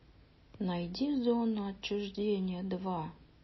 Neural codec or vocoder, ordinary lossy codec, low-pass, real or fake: none; MP3, 24 kbps; 7.2 kHz; real